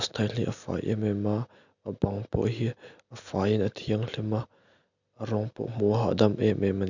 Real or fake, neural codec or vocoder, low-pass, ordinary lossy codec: real; none; 7.2 kHz; none